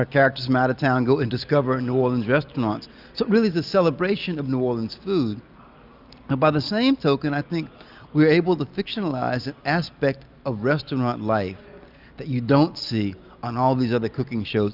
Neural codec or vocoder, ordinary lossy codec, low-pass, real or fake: none; Opus, 64 kbps; 5.4 kHz; real